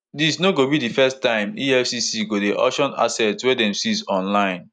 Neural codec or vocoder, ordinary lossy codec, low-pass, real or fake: none; none; 9.9 kHz; real